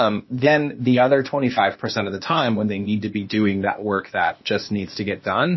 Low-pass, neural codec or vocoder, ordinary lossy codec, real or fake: 7.2 kHz; codec, 16 kHz, 0.8 kbps, ZipCodec; MP3, 24 kbps; fake